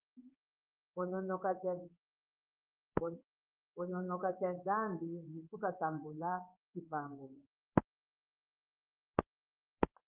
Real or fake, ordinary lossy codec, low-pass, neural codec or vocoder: fake; Opus, 32 kbps; 3.6 kHz; codec, 16 kHz in and 24 kHz out, 1 kbps, XY-Tokenizer